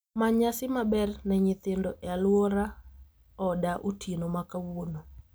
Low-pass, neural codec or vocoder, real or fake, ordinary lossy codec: none; none; real; none